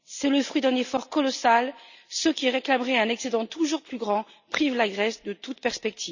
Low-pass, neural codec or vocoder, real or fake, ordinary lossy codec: 7.2 kHz; none; real; none